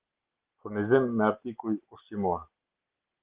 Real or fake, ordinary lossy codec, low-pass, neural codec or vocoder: real; Opus, 16 kbps; 3.6 kHz; none